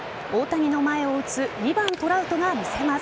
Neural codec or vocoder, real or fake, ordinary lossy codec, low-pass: none; real; none; none